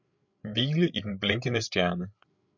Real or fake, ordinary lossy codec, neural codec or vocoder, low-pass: fake; MP3, 64 kbps; codec, 16 kHz, 8 kbps, FreqCodec, larger model; 7.2 kHz